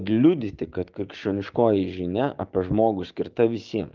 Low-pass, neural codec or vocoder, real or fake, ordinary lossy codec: 7.2 kHz; codec, 16 kHz, 6 kbps, DAC; fake; Opus, 32 kbps